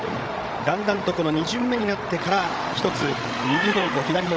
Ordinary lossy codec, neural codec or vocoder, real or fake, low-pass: none; codec, 16 kHz, 16 kbps, FreqCodec, larger model; fake; none